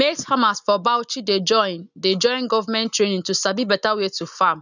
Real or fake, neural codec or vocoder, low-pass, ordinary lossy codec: real; none; 7.2 kHz; none